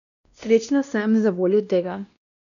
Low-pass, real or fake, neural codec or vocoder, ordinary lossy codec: 7.2 kHz; fake; codec, 16 kHz, 1 kbps, X-Codec, WavLM features, trained on Multilingual LibriSpeech; none